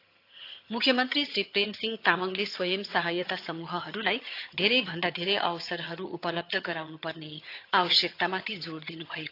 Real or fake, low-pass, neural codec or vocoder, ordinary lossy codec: fake; 5.4 kHz; vocoder, 22.05 kHz, 80 mel bands, HiFi-GAN; AAC, 32 kbps